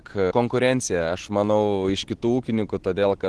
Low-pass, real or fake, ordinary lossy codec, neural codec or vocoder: 10.8 kHz; real; Opus, 16 kbps; none